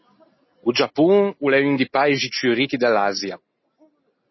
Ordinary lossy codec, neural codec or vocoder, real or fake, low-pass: MP3, 24 kbps; none; real; 7.2 kHz